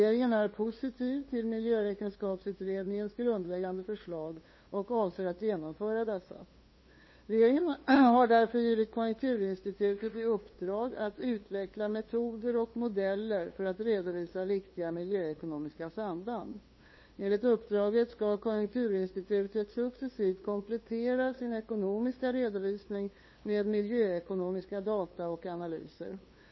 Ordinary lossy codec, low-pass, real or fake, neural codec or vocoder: MP3, 24 kbps; 7.2 kHz; fake; codec, 16 kHz, 2 kbps, FunCodec, trained on LibriTTS, 25 frames a second